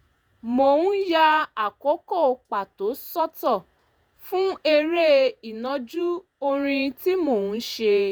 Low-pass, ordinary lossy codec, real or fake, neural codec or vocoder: none; none; fake; vocoder, 48 kHz, 128 mel bands, Vocos